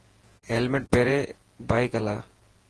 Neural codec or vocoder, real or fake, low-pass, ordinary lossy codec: vocoder, 48 kHz, 128 mel bands, Vocos; fake; 10.8 kHz; Opus, 16 kbps